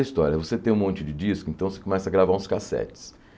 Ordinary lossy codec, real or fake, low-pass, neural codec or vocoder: none; real; none; none